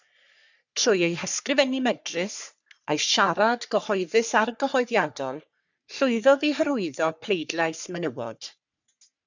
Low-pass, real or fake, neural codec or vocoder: 7.2 kHz; fake; codec, 44.1 kHz, 3.4 kbps, Pupu-Codec